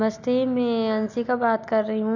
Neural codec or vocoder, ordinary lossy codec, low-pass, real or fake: none; none; 7.2 kHz; real